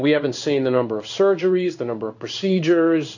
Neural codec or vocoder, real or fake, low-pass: codec, 16 kHz in and 24 kHz out, 1 kbps, XY-Tokenizer; fake; 7.2 kHz